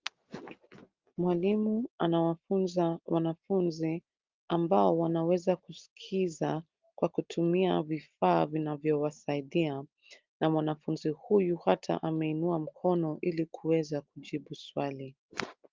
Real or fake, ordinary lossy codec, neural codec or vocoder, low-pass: real; Opus, 32 kbps; none; 7.2 kHz